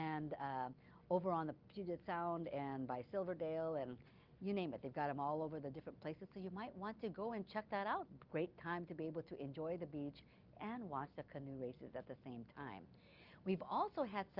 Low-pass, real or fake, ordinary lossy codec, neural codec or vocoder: 5.4 kHz; real; Opus, 32 kbps; none